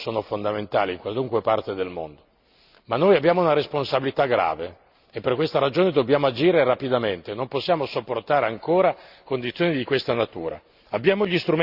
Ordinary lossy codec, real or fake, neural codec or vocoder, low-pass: Opus, 64 kbps; real; none; 5.4 kHz